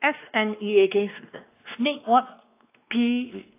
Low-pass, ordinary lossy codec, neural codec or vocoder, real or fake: 3.6 kHz; AAC, 24 kbps; codec, 16 kHz, 2 kbps, X-Codec, WavLM features, trained on Multilingual LibriSpeech; fake